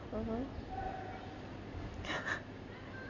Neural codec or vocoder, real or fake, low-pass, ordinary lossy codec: none; real; 7.2 kHz; none